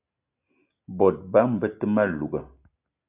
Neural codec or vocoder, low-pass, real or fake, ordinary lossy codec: none; 3.6 kHz; real; AAC, 32 kbps